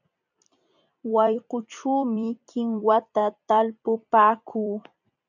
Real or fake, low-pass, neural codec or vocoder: fake; 7.2 kHz; vocoder, 44.1 kHz, 128 mel bands every 256 samples, BigVGAN v2